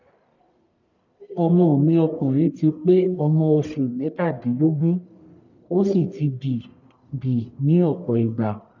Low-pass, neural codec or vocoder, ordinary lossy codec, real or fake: 7.2 kHz; codec, 44.1 kHz, 1.7 kbps, Pupu-Codec; none; fake